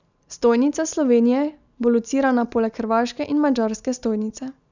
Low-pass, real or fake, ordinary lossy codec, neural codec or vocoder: 7.2 kHz; real; none; none